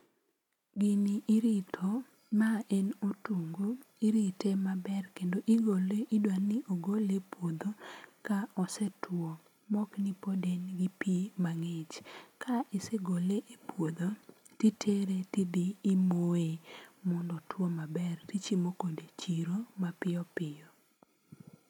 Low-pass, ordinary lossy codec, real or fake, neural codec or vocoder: 19.8 kHz; none; real; none